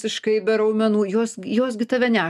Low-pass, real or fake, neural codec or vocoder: 14.4 kHz; real; none